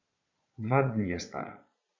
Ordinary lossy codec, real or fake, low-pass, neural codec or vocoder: none; fake; 7.2 kHz; vocoder, 22.05 kHz, 80 mel bands, Vocos